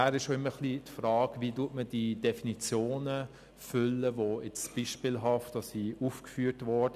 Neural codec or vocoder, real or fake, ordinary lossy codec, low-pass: none; real; none; 14.4 kHz